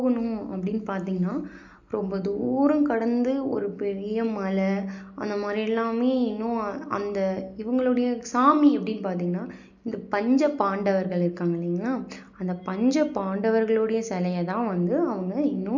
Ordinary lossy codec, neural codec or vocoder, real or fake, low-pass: none; none; real; 7.2 kHz